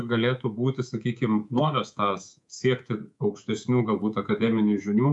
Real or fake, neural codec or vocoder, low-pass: fake; codec, 24 kHz, 3.1 kbps, DualCodec; 10.8 kHz